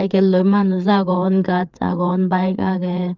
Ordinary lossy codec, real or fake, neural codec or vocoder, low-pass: Opus, 32 kbps; fake; codec, 16 kHz, 4 kbps, FreqCodec, larger model; 7.2 kHz